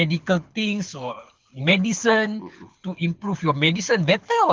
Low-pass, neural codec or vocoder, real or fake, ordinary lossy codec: 7.2 kHz; codec, 24 kHz, 6 kbps, HILCodec; fake; Opus, 32 kbps